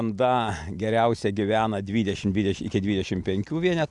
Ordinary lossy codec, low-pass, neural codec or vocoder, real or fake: Opus, 64 kbps; 10.8 kHz; none; real